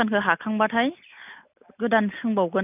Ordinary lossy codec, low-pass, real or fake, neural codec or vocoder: none; 3.6 kHz; real; none